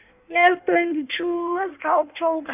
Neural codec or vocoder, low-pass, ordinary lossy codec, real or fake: codec, 16 kHz in and 24 kHz out, 1.1 kbps, FireRedTTS-2 codec; 3.6 kHz; none; fake